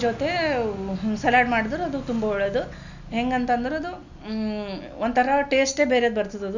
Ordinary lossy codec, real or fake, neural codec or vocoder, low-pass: none; real; none; 7.2 kHz